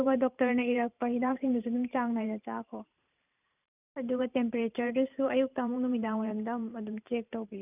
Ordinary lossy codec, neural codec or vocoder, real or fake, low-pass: none; vocoder, 44.1 kHz, 128 mel bands every 512 samples, BigVGAN v2; fake; 3.6 kHz